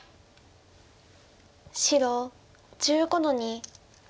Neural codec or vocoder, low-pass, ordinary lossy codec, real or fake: none; none; none; real